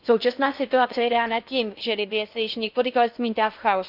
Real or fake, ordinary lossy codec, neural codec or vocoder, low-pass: fake; none; codec, 16 kHz in and 24 kHz out, 0.6 kbps, FocalCodec, streaming, 4096 codes; 5.4 kHz